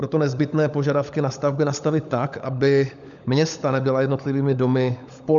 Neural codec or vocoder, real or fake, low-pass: codec, 16 kHz, 16 kbps, FunCodec, trained on LibriTTS, 50 frames a second; fake; 7.2 kHz